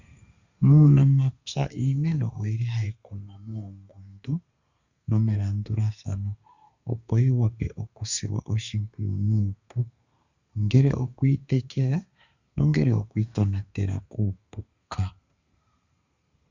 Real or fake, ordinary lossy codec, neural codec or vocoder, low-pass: fake; Opus, 64 kbps; codec, 32 kHz, 1.9 kbps, SNAC; 7.2 kHz